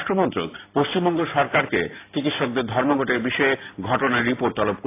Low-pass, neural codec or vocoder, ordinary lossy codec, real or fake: 3.6 kHz; none; AAC, 24 kbps; real